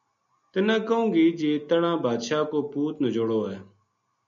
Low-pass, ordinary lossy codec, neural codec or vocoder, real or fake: 7.2 kHz; MP3, 96 kbps; none; real